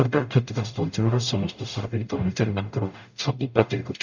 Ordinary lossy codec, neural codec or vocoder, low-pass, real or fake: none; codec, 44.1 kHz, 0.9 kbps, DAC; 7.2 kHz; fake